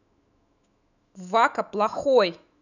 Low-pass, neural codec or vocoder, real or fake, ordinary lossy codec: 7.2 kHz; autoencoder, 48 kHz, 128 numbers a frame, DAC-VAE, trained on Japanese speech; fake; none